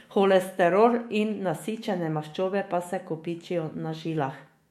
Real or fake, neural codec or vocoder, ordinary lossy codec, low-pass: fake; autoencoder, 48 kHz, 128 numbers a frame, DAC-VAE, trained on Japanese speech; MP3, 64 kbps; 19.8 kHz